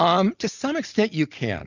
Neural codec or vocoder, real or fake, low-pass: none; real; 7.2 kHz